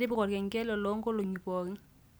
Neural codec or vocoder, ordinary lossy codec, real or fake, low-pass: none; none; real; none